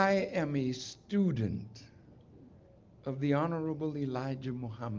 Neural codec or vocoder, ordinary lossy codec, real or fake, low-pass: none; Opus, 32 kbps; real; 7.2 kHz